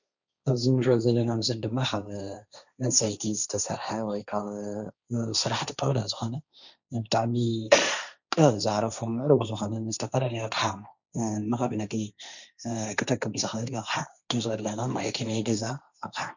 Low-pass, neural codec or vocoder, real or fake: 7.2 kHz; codec, 16 kHz, 1.1 kbps, Voila-Tokenizer; fake